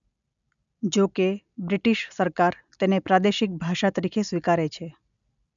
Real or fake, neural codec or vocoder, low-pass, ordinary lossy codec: real; none; 7.2 kHz; none